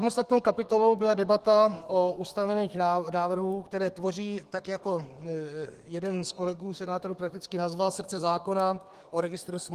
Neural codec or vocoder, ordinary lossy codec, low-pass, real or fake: codec, 32 kHz, 1.9 kbps, SNAC; Opus, 24 kbps; 14.4 kHz; fake